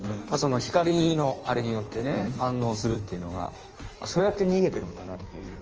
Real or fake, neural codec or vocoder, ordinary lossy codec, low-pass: fake; codec, 16 kHz in and 24 kHz out, 1.1 kbps, FireRedTTS-2 codec; Opus, 24 kbps; 7.2 kHz